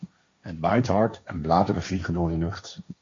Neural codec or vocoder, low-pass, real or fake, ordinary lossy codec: codec, 16 kHz, 1.1 kbps, Voila-Tokenizer; 7.2 kHz; fake; MP3, 48 kbps